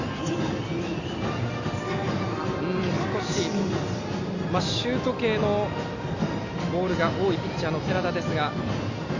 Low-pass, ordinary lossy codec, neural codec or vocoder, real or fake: 7.2 kHz; Opus, 64 kbps; none; real